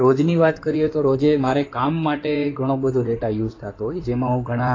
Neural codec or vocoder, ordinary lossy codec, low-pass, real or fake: codec, 16 kHz in and 24 kHz out, 2.2 kbps, FireRedTTS-2 codec; AAC, 32 kbps; 7.2 kHz; fake